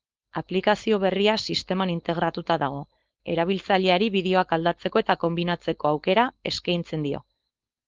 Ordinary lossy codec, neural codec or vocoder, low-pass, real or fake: Opus, 32 kbps; codec, 16 kHz, 4.8 kbps, FACodec; 7.2 kHz; fake